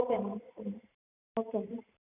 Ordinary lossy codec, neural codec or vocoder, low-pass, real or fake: none; vocoder, 44.1 kHz, 128 mel bands every 512 samples, BigVGAN v2; 3.6 kHz; fake